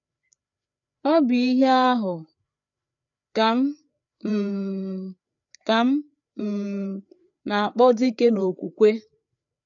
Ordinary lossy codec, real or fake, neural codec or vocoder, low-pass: none; fake; codec, 16 kHz, 4 kbps, FreqCodec, larger model; 7.2 kHz